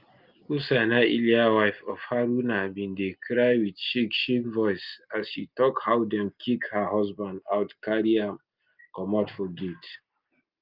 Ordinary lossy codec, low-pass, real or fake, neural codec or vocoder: Opus, 24 kbps; 5.4 kHz; real; none